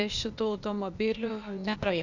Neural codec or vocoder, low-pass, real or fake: codec, 16 kHz, 0.8 kbps, ZipCodec; 7.2 kHz; fake